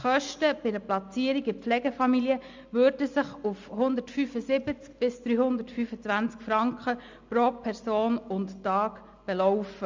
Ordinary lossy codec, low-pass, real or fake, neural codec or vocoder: MP3, 64 kbps; 7.2 kHz; real; none